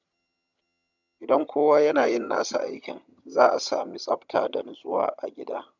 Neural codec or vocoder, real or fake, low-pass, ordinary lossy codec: vocoder, 22.05 kHz, 80 mel bands, HiFi-GAN; fake; 7.2 kHz; none